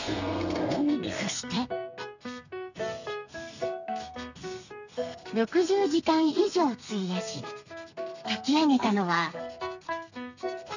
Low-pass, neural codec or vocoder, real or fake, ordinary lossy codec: 7.2 kHz; codec, 32 kHz, 1.9 kbps, SNAC; fake; none